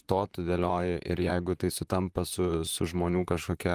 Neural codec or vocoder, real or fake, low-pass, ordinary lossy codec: vocoder, 44.1 kHz, 128 mel bands, Pupu-Vocoder; fake; 14.4 kHz; Opus, 32 kbps